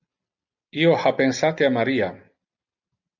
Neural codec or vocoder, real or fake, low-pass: none; real; 7.2 kHz